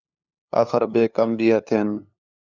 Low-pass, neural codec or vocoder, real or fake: 7.2 kHz; codec, 16 kHz, 2 kbps, FunCodec, trained on LibriTTS, 25 frames a second; fake